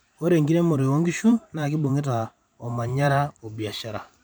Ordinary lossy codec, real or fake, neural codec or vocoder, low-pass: none; fake; vocoder, 44.1 kHz, 128 mel bands every 512 samples, BigVGAN v2; none